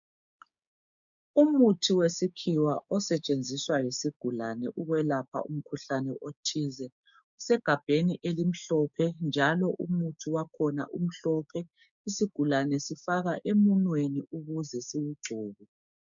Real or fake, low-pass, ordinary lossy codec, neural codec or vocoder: fake; 7.2 kHz; MP3, 64 kbps; codec, 16 kHz, 6 kbps, DAC